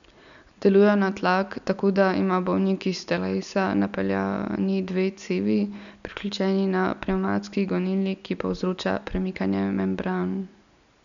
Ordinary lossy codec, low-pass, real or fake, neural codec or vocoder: none; 7.2 kHz; real; none